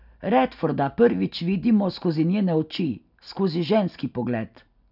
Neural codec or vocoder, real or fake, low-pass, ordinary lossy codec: none; real; 5.4 kHz; none